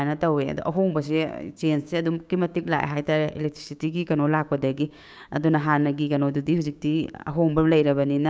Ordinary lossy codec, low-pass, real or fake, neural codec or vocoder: none; none; fake; codec, 16 kHz, 6 kbps, DAC